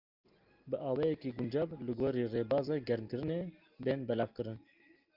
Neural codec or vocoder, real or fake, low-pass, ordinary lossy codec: none; real; 5.4 kHz; Opus, 24 kbps